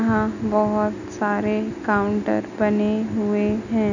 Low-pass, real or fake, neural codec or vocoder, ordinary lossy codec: 7.2 kHz; real; none; none